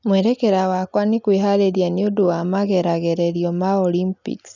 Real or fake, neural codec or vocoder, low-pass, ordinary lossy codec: real; none; 7.2 kHz; none